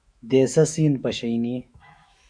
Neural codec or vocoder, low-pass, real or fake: autoencoder, 48 kHz, 128 numbers a frame, DAC-VAE, trained on Japanese speech; 9.9 kHz; fake